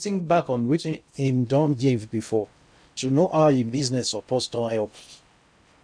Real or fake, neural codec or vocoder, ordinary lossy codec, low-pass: fake; codec, 16 kHz in and 24 kHz out, 0.6 kbps, FocalCodec, streaming, 2048 codes; none; 9.9 kHz